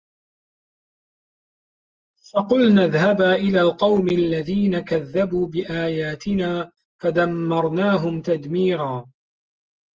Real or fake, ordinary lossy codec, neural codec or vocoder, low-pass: real; Opus, 24 kbps; none; 7.2 kHz